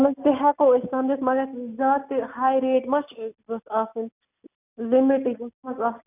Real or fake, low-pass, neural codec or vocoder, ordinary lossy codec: real; 3.6 kHz; none; none